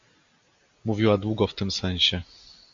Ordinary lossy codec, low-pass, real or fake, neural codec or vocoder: Opus, 64 kbps; 7.2 kHz; real; none